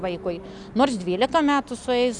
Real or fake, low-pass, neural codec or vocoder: real; 10.8 kHz; none